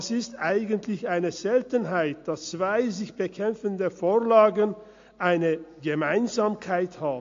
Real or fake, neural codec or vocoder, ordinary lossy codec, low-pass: real; none; AAC, 48 kbps; 7.2 kHz